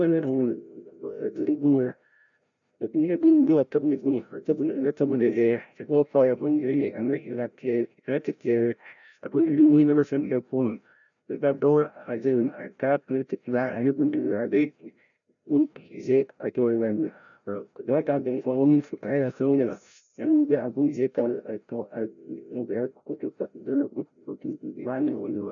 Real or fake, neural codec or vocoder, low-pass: fake; codec, 16 kHz, 0.5 kbps, FreqCodec, larger model; 7.2 kHz